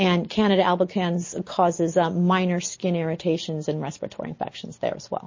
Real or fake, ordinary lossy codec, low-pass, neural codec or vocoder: real; MP3, 32 kbps; 7.2 kHz; none